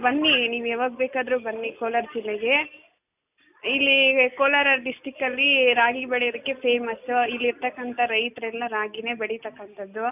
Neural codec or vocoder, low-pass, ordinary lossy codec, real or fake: none; 3.6 kHz; none; real